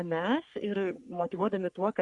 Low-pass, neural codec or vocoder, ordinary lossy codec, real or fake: 10.8 kHz; codec, 44.1 kHz, 3.4 kbps, Pupu-Codec; Opus, 64 kbps; fake